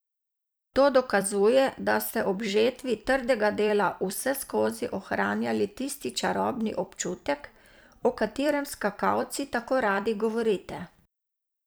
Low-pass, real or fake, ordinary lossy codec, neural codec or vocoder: none; fake; none; vocoder, 44.1 kHz, 128 mel bands every 256 samples, BigVGAN v2